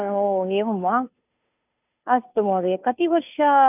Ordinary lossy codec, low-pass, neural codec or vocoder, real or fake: none; 3.6 kHz; codec, 16 kHz, 2 kbps, FunCodec, trained on Chinese and English, 25 frames a second; fake